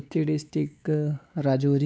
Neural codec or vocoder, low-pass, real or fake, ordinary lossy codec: codec, 16 kHz, 4 kbps, X-Codec, WavLM features, trained on Multilingual LibriSpeech; none; fake; none